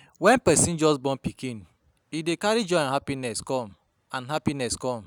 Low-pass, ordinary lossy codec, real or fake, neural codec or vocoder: none; none; real; none